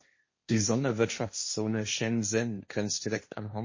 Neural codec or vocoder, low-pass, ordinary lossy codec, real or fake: codec, 16 kHz, 1.1 kbps, Voila-Tokenizer; 7.2 kHz; MP3, 32 kbps; fake